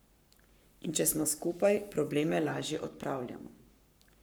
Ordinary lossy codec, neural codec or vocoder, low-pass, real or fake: none; codec, 44.1 kHz, 7.8 kbps, Pupu-Codec; none; fake